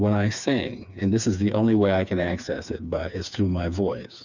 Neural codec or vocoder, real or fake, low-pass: codec, 16 kHz, 4 kbps, FreqCodec, smaller model; fake; 7.2 kHz